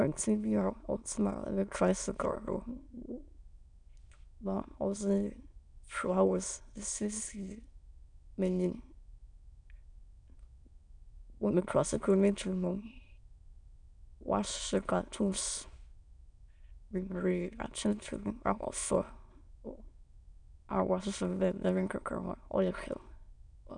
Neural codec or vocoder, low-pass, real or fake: autoencoder, 22.05 kHz, a latent of 192 numbers a frame, VITS, trained on many speakers; 9.9 kHz; fake